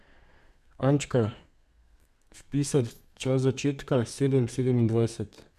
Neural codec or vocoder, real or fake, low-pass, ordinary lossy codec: codec, 32 kHz, 1.9 kbps, SNAC; fake; 14.4 kHz; none